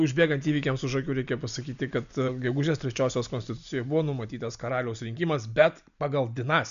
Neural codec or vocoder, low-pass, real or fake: none; 7.2 kHz; real